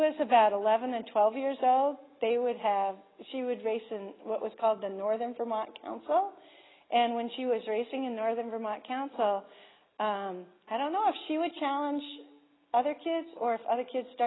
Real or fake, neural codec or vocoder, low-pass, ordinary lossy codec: real; none; 7.2 kHz; AAC, 16 kbps